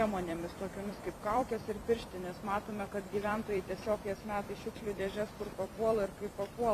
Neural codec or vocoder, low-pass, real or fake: vocoder, 44.1 kHz, 128 mel bands every 256 samples, BigVGAN v2; 14.4 kHz; fake